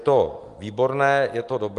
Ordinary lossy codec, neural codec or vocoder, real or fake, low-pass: Opus, 32 kbps; none; real; 10.8 kHz